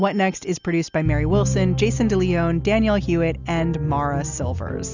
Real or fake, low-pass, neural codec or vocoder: real; 7.2 kHz; none